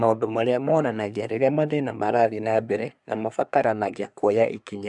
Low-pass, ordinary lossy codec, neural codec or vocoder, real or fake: 10.8 kHz; none; codec, 24 kHz, 1 kbps, SNAC; fake